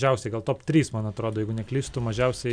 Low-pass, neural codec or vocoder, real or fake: 9.9 kHz; none; real